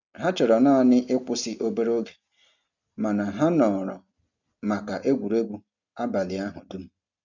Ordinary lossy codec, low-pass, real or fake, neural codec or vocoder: none; 7.2 kHz; real; none